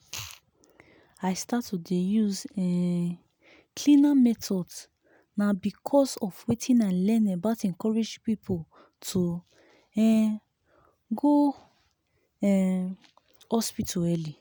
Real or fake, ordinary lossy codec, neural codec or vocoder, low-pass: real; none; none; none